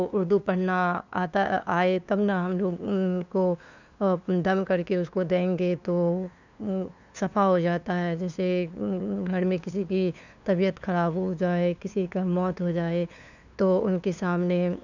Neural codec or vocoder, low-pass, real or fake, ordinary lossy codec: codec, 16 kHz, 2 kbps, FunCodec, trained on LibriTTS, 25 frames a second; 7.2 kHz; fake; none